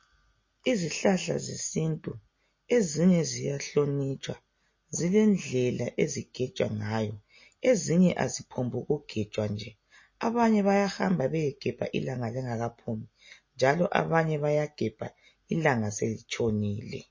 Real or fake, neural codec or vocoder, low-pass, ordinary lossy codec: real; none; 7.2 kHz; MP3, 32 kbps